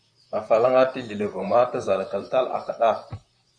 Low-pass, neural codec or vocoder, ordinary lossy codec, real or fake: 9.9 kHz; vocoder, 44.1 kHz, 128 mel bands, Pupu-Vocoder; Opus, 64 kbps; fake